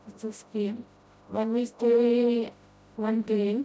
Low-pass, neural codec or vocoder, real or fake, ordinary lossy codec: none; codec, 16 kHz, 0.5 kbps, FreqCodec, smaller model; fake; none